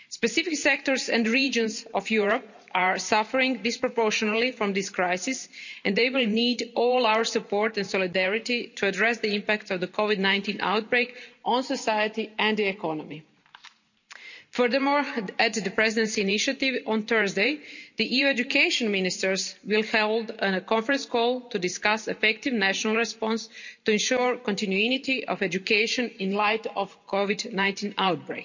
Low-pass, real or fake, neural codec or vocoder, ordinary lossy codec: 7.2 kHz; fake; vocoder, 44.1 kHz, 128 mel bands every 512 samples, BigVGAN v2; none